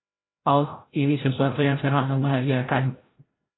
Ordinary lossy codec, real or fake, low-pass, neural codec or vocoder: AAC, 16 kbps; fake; 7.2 kHz; codec, 16 kHz, 0.5 kbps, FreqCodec, larger model